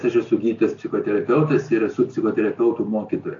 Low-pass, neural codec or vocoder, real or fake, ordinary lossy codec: 7.2 kHz; none; real; AAC, 48 kbps